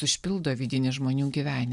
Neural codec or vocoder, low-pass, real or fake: none; 10.8 kHz; real